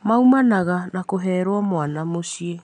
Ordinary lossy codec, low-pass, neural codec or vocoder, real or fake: none; 9.9 kHz; none; real